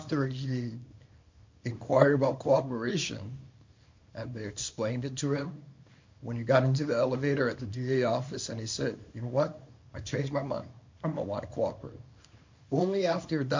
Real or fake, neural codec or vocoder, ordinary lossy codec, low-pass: fake; codec, 24 kHz, 0.9 kbps, WavTokenizer, small release; MP3, 48 kbps; 7.2 kHz